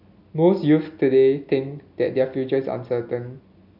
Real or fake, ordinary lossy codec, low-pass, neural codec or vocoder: real; none; 5.4 kHz; none